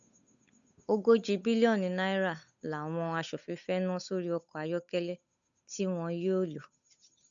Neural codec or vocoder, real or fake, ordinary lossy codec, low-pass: codec, 16 kHz, 8 kbps, FunCodec, trained on Chinese and English, 25 frames a second; fake; none; 7.2 kHz